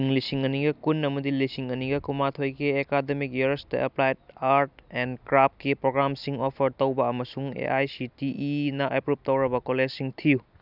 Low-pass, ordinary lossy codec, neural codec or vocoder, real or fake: 5.4 kHz; none; none; real